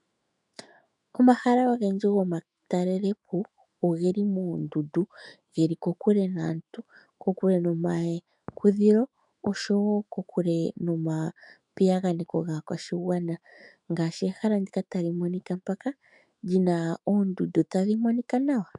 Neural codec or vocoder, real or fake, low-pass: autoencoder, 48 kHz, 128 numbers a frame, DAC-VAE, trained on Japanese speech; fake; 10.8 kHz